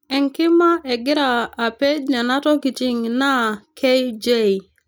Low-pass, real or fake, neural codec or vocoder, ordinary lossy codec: none; real; none; none